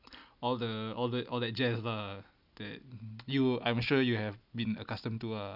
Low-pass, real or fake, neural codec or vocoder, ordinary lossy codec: 5.4 kHz; real; none; none